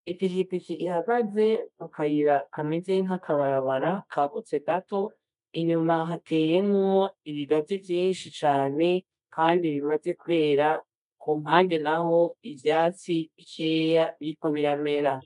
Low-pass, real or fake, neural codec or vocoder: 10.8 kHz; fake; codec, 24 kHz, 0.9 kbps, WavTokenizer, medium music audio release